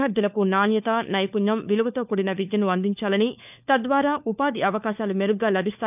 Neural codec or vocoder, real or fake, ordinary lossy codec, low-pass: codec, 16 kHz, 4 kbps, FunCodec, trained on LibriTTS, 50 frames a second; fake; none; 3.6 kHz